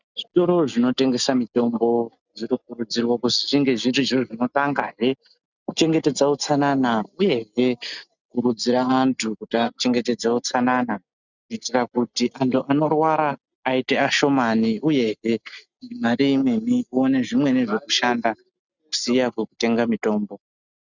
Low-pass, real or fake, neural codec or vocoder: 7.2 kHz; real; none